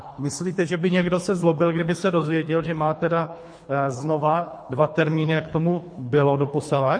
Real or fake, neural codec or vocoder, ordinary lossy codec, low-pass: fake; codec, 24 kHz, 3 kbps, HILCodec; MP3, 48 kbps; 9.9 kHz